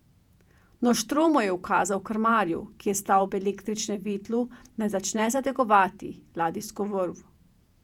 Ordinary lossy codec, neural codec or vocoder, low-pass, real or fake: none; vocoder, 48 kHz, 128 mel bands, Vocos; 19.8 kHz; fake